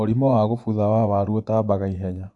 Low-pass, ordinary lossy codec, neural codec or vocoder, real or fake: 10.8 kHz; none; vocoder, 24 kHz, 100 mel bands, Vocos; fake